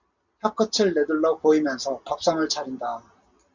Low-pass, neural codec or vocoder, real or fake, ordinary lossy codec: 7.2 kHz; none; real; MP3, 64 kbps